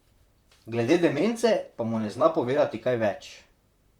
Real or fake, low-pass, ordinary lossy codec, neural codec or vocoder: fake; 19.8 kHz; Opus, 64 kbps; vocoder, 44.1 kHz, 128 mel bands, Pupu-Vocoder